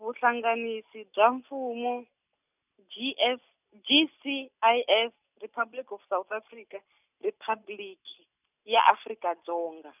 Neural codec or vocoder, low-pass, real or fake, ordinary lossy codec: none; 3.6 kHz; real; none